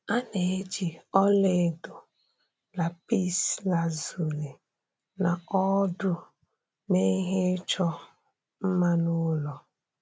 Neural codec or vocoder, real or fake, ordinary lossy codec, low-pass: none; real; none; none